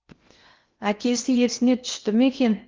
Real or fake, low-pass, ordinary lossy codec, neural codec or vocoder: fake; 7.2 kHz; Opus, 32 kbps; codec, 16 kHz in and 24 kHz out, 0.8 kbps, FocalCodec, streaming, 65536 codes